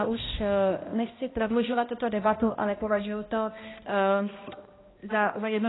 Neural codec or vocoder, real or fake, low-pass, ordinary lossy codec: codec, 16 kHz, 1 kbps, X-Codec, HuBERT features, trained on balanced general audio; fake; 7.2 kHz; AAC, 16 kbps